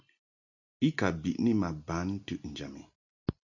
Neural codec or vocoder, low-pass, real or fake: none; 7.2 kHz; real